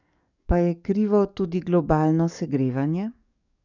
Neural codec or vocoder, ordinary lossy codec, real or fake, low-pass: codec, 44.1 kHz, 7.8 kbps, DAC; none; fake; 7.2 kHz